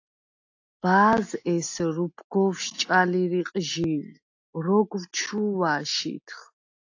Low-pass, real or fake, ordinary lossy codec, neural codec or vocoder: 7.2 kHz; real; AAC, 48 kbps; none